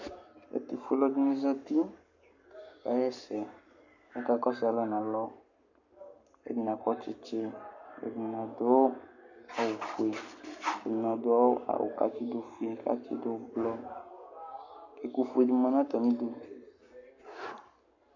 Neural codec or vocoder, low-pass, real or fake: codec, 44.1 kHz, 7.8 kbps, Pupu-Codec; 7.2 kHz; fake